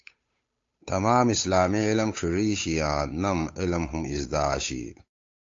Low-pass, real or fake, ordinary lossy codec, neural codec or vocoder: 7.2 kHz; fake; AAC, 48 kbps; codec, 16 kHz, 8 kbps, FunCodec, trained on Chinese and English, 25 frames a second